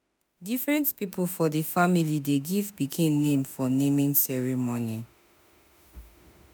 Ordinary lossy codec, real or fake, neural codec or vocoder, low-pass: none; fake; autoencoder, 48 kHz, 32 numbers a frame, DAC-VAE, trained on Japanese speech; none